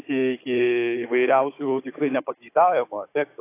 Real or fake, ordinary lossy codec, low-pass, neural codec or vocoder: fake; AAC, 24 kbps; 3.6 kHz; codec, 16 kHz, 16 kbps, FunCodec, trained on Chinese and English, 50 frames a second